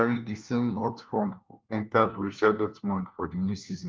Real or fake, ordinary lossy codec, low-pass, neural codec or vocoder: fake; Opus, 24 kbps; 7.2 kHz; codec, 16 kHz, 1 kbps, FunCodec, trained on LibriTTS, 50 frames a second